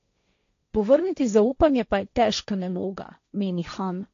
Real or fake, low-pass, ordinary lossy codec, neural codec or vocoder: fake; 7.2 kHz; AAC, 48 kbps; codec, 16 kHz, 1.1 kbps, Voila-Tokenizer